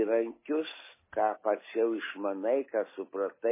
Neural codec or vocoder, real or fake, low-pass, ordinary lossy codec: none; real; 3.6 kHz; MP3, 16 kbps